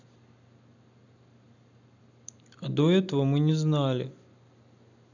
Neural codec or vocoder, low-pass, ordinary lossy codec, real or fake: none; 7.2 kHz; none; real